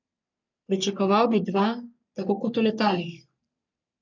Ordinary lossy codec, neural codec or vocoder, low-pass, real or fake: none; codec, 44.1 kHz, 3.4 kbps, Pupu-Codec; 7.2 kHz; fake